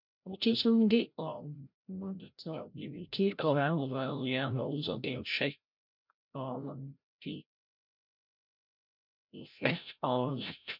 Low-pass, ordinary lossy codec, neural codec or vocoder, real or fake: 5.4 kHz; none; codec, 16 kHz, 0.5 kbps, FreqCodec, larger model; fake